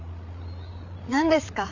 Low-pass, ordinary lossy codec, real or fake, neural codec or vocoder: 7.2 kHz; none; fake; codec, 16 kHz, 8 kbps, FreqCodec, larger model